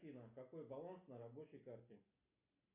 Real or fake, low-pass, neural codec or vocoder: real; 3.6 kHz; none